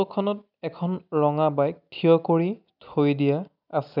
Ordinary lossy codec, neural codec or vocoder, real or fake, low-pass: none; none; real; 5.4 kHz